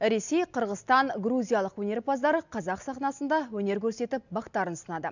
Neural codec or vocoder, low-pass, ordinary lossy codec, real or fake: none; 7.2 kHz; none; real